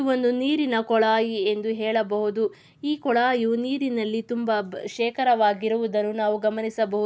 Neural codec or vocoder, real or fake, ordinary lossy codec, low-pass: none; real; none; none